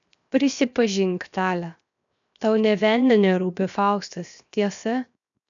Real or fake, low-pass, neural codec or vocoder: fake; 7.2 kHz; codec, 16 kHz, 0.7 kbps, FocalCodec